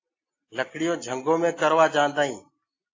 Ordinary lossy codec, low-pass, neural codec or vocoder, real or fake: AAC, 32 kbps; 7.2 kHz; none; real